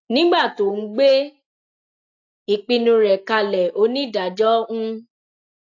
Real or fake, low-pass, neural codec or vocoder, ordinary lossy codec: real; 7.2 kHz; none; AAC, 48 kbps